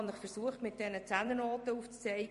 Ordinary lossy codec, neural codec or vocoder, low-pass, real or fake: MP3, 48 kbps; vocoder, 44.1 kHz, 128 mel bands every 256 samples, BigVGAN v2; 14.4 kHz; fake